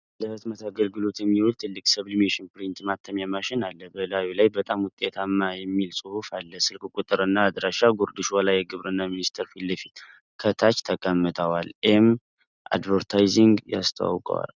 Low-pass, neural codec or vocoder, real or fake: 7.2 kHz; none; real